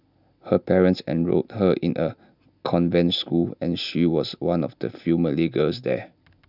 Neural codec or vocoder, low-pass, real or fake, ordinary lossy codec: none; 5.4 kHz; real; none